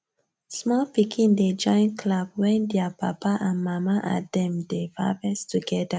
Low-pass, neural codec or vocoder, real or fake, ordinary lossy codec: none; none; real; none